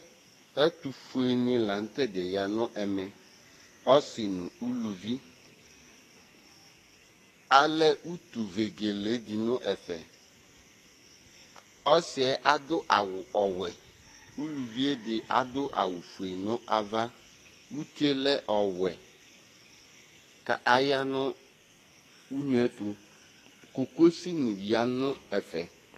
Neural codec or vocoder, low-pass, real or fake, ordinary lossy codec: codec, 44.1 kHz, 2.6 kbps, SNAC; 14.4 kHz; fake; AAC, 48 kbps